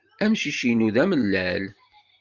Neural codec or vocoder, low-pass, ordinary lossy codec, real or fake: vocoder, 44.1 kHz, 128 mel bands every 512 samples, BigVGAN v2; 7.2 kHz; Opus, 24 kbps; fake